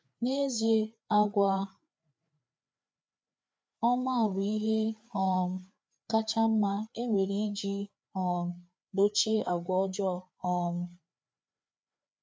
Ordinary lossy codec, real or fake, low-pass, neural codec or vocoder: none; fake; none; codec, 16 kHz, 4 kbps, FreqCodec, larger model